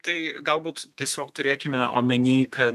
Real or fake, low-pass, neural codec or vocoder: fake; 14.4 kHz; codec, 32 kHz, 1.9 kbps, SNAC